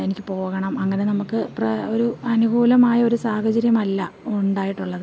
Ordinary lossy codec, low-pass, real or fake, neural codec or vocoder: none; none; real; none